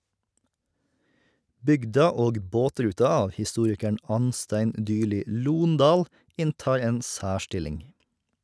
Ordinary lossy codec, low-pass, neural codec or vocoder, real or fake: none; none; none; real